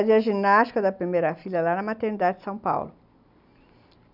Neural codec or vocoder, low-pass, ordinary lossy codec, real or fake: none; 5.4 kHz; none; real